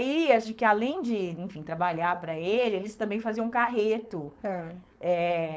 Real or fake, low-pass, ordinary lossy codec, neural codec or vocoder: fake; none; none; codec, 16 kHz, 4.8 kbps, FACodec